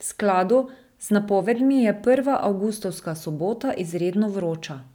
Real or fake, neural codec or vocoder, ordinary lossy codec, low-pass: real; none; none; 19.8 kHz